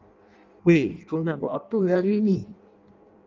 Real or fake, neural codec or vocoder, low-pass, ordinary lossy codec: fake; codec, 16 kHz in and 24 kHz out, 0.6 kbps, FireRedTTS-2 codec; 7.2 kHz; Opus, 32 kbps